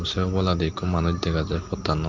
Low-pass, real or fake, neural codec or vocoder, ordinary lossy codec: 7.2 kHz; real; none; Opus, 24 kbps